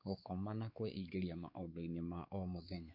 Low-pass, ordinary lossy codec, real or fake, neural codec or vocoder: 5.4 kHz; MP3, 48 kbps; fake; codec, 16 kHz, 4 kbps, X-Codec, WavLM features, trained on Multilingual LibriSpeech